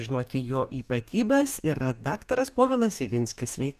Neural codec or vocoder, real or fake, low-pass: codec, 44.1 kHz, 2.6 kbps, DAC; fake; 14.4 kHz